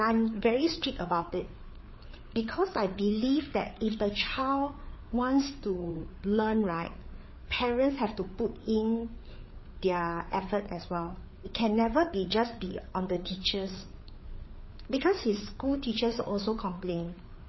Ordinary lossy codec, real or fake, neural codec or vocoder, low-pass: MP3, 24 kbps; fake; codec, 16 kHz, 4 kbps, FreqCodec, larger model; 7.2 kHz